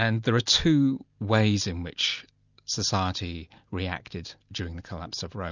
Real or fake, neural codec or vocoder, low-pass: real; none; 7.2 kHz